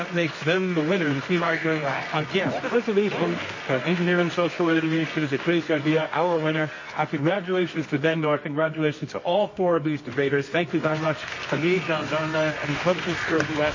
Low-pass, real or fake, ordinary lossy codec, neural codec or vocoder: 7.2 kHz; fake; MP3, 32 kbps; codec, 24 kHz, 0.9 kbps, WavTokenizer, medium music audio release